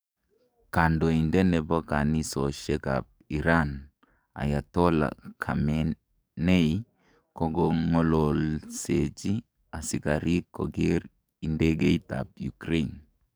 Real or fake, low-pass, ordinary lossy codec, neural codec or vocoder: fake; none; none; codec, 44.1 kHz, 7.8 kbps, DAC